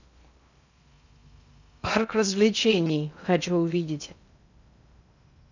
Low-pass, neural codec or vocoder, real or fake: 7.2 kHz; codec, 16 kHz in and 24 kHz out, 0.6 kbps, FocalCodec, streaming, 2048 codes; fake